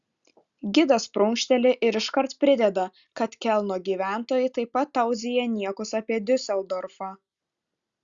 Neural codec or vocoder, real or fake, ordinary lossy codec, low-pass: none; real; Opus, 64 kbps; 7.2 kHz